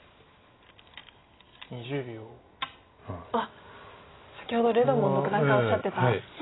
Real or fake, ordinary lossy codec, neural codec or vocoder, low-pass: real; AAC, 16 kbps; none; 7.2 kHz